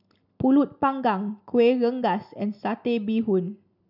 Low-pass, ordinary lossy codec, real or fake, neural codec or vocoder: 5.4 kHz; none; real; none